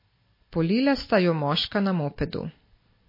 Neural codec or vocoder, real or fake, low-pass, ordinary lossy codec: none; real; 5.4 kHz; MP3, 24 kbps